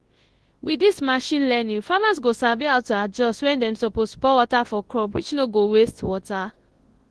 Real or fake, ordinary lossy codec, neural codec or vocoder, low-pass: fake; Opus, 16 kbps; codec, 24 kHz, 0.9 kbps, WavTokenizer, large speech release; 10.8 kHz